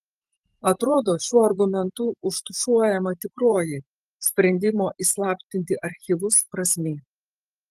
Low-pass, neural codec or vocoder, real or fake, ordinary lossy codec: 14.4 kHz; vocoder, 44.1 kHz, 128 mel bands every 512 samples, BigVGAN v2; fake; Opus, 24 kbps